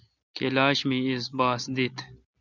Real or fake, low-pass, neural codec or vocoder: real; 7.2 kHz; none